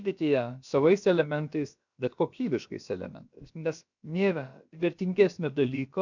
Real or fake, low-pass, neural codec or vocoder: fake; 7.2 kHz; codec, 16 kHz, about 1 kbps, DyCAST, with the encoder's durations